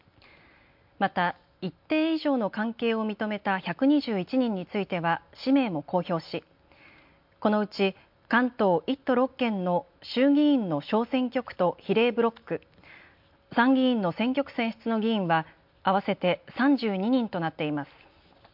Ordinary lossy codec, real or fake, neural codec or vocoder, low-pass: MP3, 48 kbps; real; none; 5.4 kHz